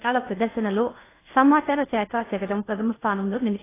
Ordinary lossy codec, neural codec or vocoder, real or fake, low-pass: AAC, 16 kbps; codec, 16 kHz in and 24 kHz out, 0.6 kbps, FocalCodec, streaming, 2048 codes; fake; 3.6 kHz